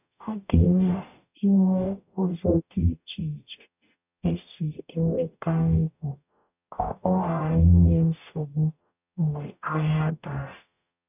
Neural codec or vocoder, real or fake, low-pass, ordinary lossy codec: codec, 44.1 kHz, 0.9 kbps, DAC; fake; 3.6 kHz; none